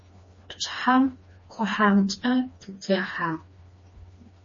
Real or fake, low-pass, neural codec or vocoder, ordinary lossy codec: fake; 7.2 kHz; codec, 16 kHz, 2 kbps, FreqCodec, smaller model; MP3, 32 kbps